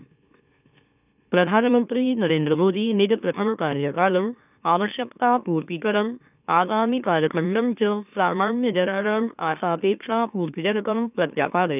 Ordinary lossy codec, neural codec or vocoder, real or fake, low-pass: none; autoencoder, 44.1 kHz, a latent of 192 numbers a frame, MeloTTS; fake; 3.6 kHz